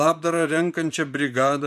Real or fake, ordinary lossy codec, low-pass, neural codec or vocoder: fake; MP3, 96 kbps; 14.4 kHz; vocoder, 44.1 kHz, 128 mel bands, Pupu-Vocoder